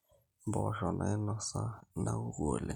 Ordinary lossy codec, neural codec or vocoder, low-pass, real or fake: none; none; 19.8 kHz; real